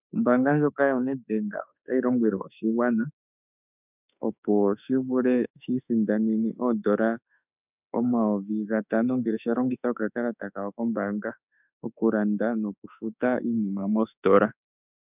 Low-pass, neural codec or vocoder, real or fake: 3.6 kHz; autoencoder, 48 kHz, 32 numbers a frame, DAC-VAE, trained on Japanese speech; fake